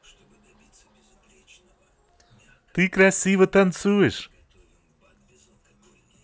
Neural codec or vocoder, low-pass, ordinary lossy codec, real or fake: none; none; none; real